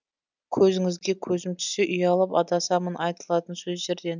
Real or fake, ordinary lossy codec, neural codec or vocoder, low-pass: real; none; none; 7.2 kHz